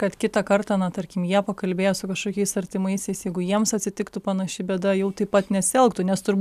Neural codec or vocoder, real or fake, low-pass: none; real; 14.4 kHz